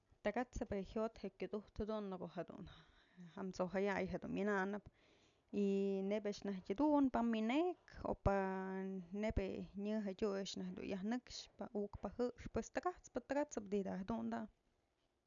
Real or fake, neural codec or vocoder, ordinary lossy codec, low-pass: real; none; none; 7.2 kHz